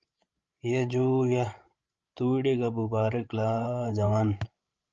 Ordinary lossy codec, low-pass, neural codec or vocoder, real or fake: Opus, 24 kbps; 7.2 kHz; codec, 16 kHz, 16 kbps, FreqCodec, larger model; fake